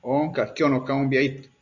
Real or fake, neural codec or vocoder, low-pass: real; none; 7.2 kHz